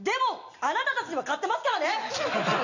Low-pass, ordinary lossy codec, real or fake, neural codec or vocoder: 7.2 kHz; none; real; none